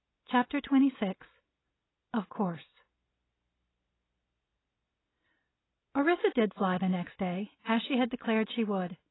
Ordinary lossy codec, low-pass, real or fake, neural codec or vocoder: AAC, 16 kbps; 7.2 kHz; real; none